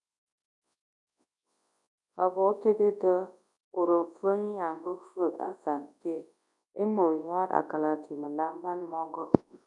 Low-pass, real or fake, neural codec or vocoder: 10.8 kHz; fake; codec, 24 kHz, 0.9 kbps, WavTokenizer, large speech release